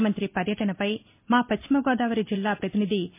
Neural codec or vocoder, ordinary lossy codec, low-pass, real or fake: none; MP3, 24 kbps; 3.6 kHz; real